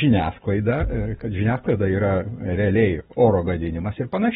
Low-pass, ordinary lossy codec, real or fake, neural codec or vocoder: 19.8 kHz; AAC, 16 kbps; real; none